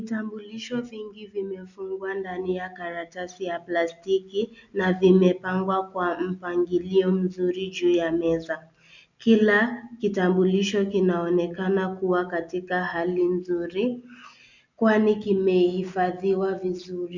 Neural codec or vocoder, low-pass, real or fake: none; 7.2 kHz; real